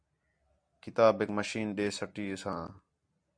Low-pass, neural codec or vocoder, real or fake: 9.9 kHz; none; real